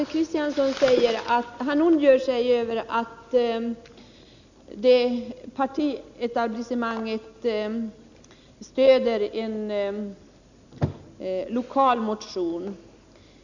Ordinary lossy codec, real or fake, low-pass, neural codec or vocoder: none; real; 7.2 kHz; none